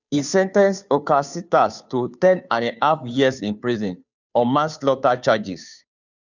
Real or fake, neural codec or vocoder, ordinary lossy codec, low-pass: fake; codec, 16 kHz, 2 kbps, FunCodec, trained on Chinese and English, 25 frames a second; none; 7.2 kHz